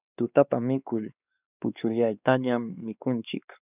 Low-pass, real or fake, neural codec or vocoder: 3.6 kHz; fake; codec, 16 kHz, 2 kbps, X-Codec, WavLM features, trained on Multilingual LibriSpeech